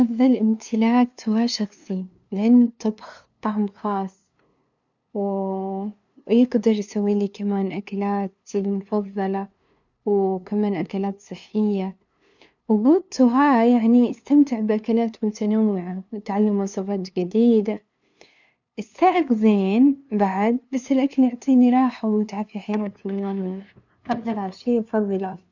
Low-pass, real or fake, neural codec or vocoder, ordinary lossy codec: 7.2 kHz; fake; codec, 16 kHz, 2 kbps, FunCodec, trained on LibriTTS, 25 frames a second; none